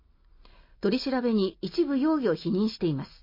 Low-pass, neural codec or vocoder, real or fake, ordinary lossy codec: 5.4 kHz; none; real; MP3, 24 kbps